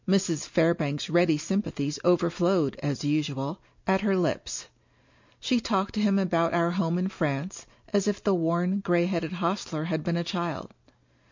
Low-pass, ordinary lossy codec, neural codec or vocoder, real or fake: 7.2 kHz; MP3, 48 kbps; none; real